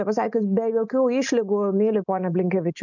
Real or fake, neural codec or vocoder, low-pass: real; none; 7.2 kHz